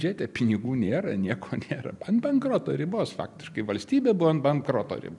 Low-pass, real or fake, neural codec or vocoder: 10.8 kHz; real; none